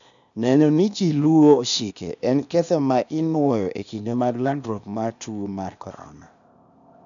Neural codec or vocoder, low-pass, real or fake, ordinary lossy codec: codec, 16 kHz, 0.8 kbps, ZipCodec; 7.2 kHz; fake; MP3, 96 kbps